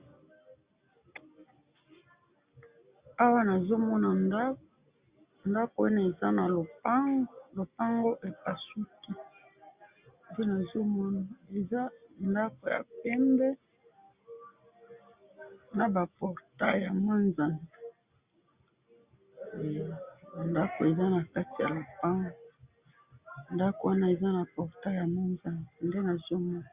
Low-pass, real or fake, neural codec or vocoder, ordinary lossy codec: 3.6 kHz; real; none; Opus, 64 kbps